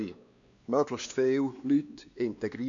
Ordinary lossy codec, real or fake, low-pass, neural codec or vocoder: none; fake; 7.2 kHz; codec, 16 kHz, 2 kbps, X-Codec, WavLM features, trained on Multilingual LibriSpeech